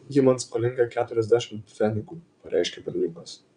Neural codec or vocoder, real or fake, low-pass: vocoder, 22.05 kHz, 80 mel bands, Vocos; fake; 9.9 kHz